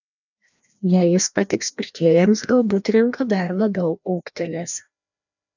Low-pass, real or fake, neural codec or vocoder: 7.2 kHz; fake; codec, 16 kHz, 1 kbps, FreqCodec, larger model